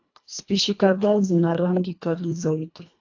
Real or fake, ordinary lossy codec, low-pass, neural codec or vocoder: fake; AAC, 48 kbps; 7.2 kHz; codec, 24 kHz, 1.5 kbps, HILCodec